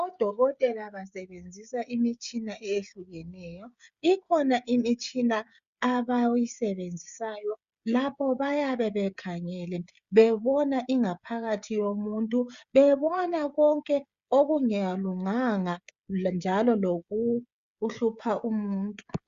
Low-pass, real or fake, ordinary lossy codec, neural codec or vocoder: 7.2 kHz; fake; Opus, 64 kbps; codec, 16 kHz, 16 kbps, FreqCodec, smaller model